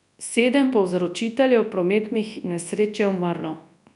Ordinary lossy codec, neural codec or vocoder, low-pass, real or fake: none; codec, 24 kHz, 0.9 kbps, WavTokenizer, large speech release; 10.8 kHz; fake